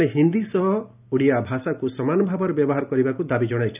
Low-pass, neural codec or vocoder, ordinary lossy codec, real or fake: 3.6 kHz; none; none; real